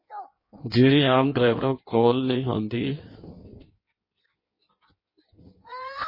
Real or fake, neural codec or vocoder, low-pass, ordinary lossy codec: fake; codec, 16 kHz in and 24 kHz out, 1.1 kbps, FireRedTTS-2 codec; 5.4 kHz; MP3, 24 kbps